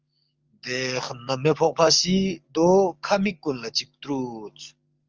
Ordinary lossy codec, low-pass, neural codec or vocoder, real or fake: Opus, 24 kbps; 7.2 kHz; codec, 44.1 kHz, 7.8 kbps, DAC; fake